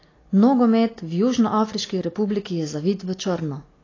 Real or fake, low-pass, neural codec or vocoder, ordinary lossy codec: real; 7.2 kHz; none; AAC, 32 kbps